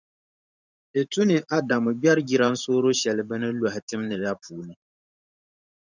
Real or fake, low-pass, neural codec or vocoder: fake; 7.2 kHz; vocoder, 44.1 kHz, 128 mel bands every 512 samples, BigVGAN v2